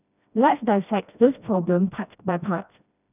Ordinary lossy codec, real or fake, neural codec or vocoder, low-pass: none; fake; codec, 16 kHz, 1 kbps, FreqCodec, smaller model; 3.6 kHz